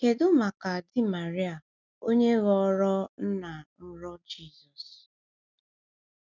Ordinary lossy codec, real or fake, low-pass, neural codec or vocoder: none; real; 7.2 kHz; none